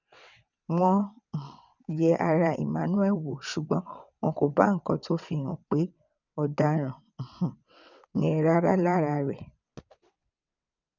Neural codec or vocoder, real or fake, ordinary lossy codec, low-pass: vocoder, 22.05 kHz, 80 mel bands, WaveNeXt; fake; none; 7.2 kHz